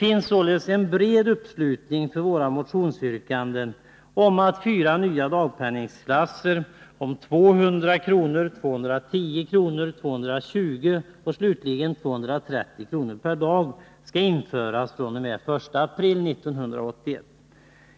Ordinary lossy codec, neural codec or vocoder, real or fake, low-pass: none; none; real; none